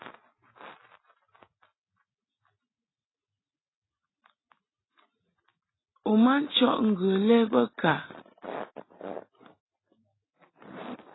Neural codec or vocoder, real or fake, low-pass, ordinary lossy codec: none; real; 7.2 kHz; AAC, 16 kbps